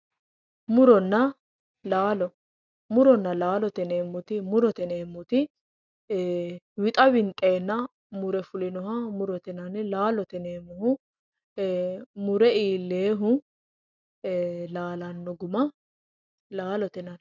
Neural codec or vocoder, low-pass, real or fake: none; 7.2 kHz; real